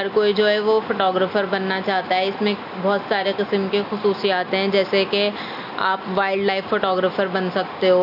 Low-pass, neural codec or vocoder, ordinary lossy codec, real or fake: 5.4 kHz; none; none; real